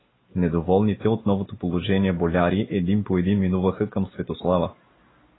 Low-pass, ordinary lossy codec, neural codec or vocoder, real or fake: 7.2 kHz; AAC, 16 kbps; none; real